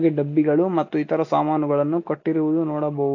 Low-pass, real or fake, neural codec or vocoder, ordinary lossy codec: 7.2 kHz; real; none; AAC, 48 kbps